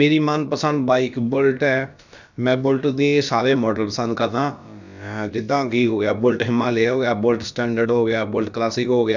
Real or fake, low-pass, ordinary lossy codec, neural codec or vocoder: fake; 7.2 kHz; none; codec, 16 kHz, about 1 kbps, DyCAST, with the encoder's durations